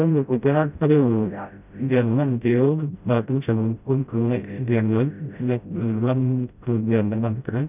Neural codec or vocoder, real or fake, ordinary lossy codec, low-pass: codec, 16 kHz, 0.5 kbps, FreqCodec, smaller model; fake; none; 3.6 kHz